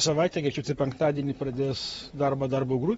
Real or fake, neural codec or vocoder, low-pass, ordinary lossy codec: fake; vocoder, 44.1 kHz, 128 mel bands, Pupu-Vocoder; 19.8 kHz; AAC, 24 kbps